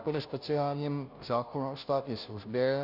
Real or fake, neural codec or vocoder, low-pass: fake; codec, 16 kHz, 0.5 kbps, FunCodec, trained on Chinese and English, 25 frames a second; 5.4 kHz